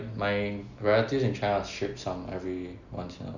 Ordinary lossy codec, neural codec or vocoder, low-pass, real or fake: none; none; 7.2 kHz; real